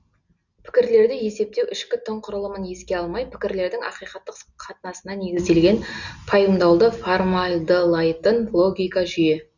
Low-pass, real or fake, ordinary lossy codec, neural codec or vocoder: 7.2 kHz; real; none; none